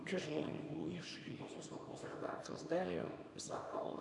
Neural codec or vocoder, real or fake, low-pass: codec, 24 kHz, 0.9 kbps, WavTokenizer, small release; fake; 10.8 kHz